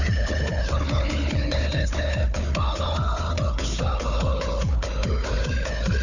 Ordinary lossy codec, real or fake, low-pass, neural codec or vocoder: none; fake; 7.2 kHz; codec, 16 kHz, 4 kbps, FunCodec, trained on Chinese and English, 50 frames a second